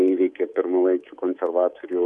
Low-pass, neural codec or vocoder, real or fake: 10.8 kHz; codec, 24 kHz, 3.1 kbps, DualCodec; fake